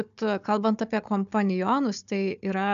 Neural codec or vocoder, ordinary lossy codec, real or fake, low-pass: codec, 16 kHz, 4 kbps, FunCodec, trained on Chinese and English, 50 frames a second; AAC, 96 kbps; fake; 7.2 kHz